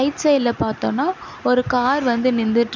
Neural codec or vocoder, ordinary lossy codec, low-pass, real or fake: none; none; 7.2 kHz; real